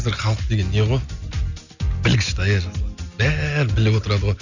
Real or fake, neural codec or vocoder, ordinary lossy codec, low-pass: real; none; none; 7.2 kHz